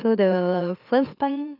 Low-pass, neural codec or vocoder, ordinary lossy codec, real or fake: 5.4 kHz; autoencoder, 44.1 kHz, a latent of 192 numbers a frame, MeloTTS; none; fake